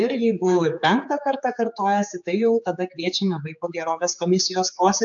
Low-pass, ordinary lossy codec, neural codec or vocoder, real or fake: 7.2 kHz; AAC, 64 kbps; codec, 16 kHz, 4 kbps, X-Codec, HuBERT features, trained on general audio; fake